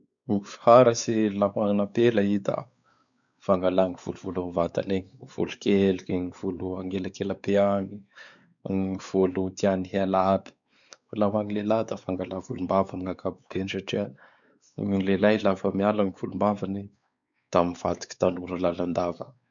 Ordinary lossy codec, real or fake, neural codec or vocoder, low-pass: none; fake; codec, 16 kHz, 4 kbps, X-Codec, WavLM features, trained on Multilingual LibriSpeech; 7.2 kHz